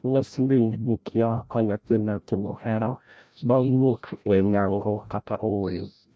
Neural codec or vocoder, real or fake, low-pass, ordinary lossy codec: codec, 16 kHz, 0.5 kbps, FreqCodec, larger model; fake; none; none